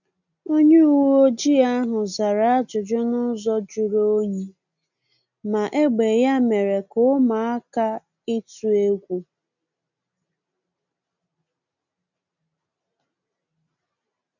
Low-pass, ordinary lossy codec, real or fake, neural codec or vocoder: 7.2 kHz; none; real; none